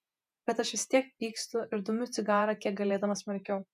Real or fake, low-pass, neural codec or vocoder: fake; 14.4 kHz; vocoder, 48 kHz, 128 mel bands, Vocos